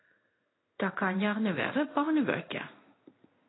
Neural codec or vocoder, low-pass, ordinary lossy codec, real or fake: codec, 16 kHz in and 24 kHz out, 1 kbps, XY-Tokenizer; 7.2 kHz; AAC, 16 kbps; fake